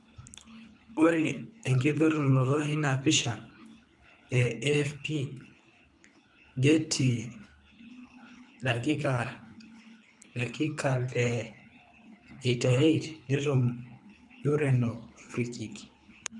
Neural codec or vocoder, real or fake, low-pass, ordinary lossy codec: codec, 24 kHz, 3 kbps, HILCodec; fake; 10.8 kHz; none